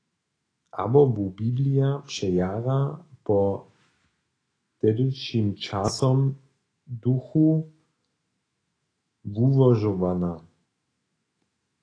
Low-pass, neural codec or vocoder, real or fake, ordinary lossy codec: 9.9 kHz; autoencoder, 48 kHz, 128 numbers a frame, DAC-VAE, trained on Japanese speech; fake; AAC, 32 kbps